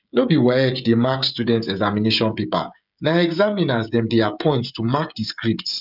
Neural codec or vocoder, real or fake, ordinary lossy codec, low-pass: codec, 16 kHz, 16 kbps, FreqCodec, smaller model; fake; none; 5.4 kHz